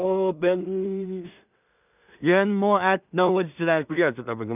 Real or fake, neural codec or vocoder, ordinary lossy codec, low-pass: fake; codec, 16 kHz in and 24 kHz out, 0.4 kbps, LongCat-Audio-Codec, two codebook decoder; none; 3.6 kHz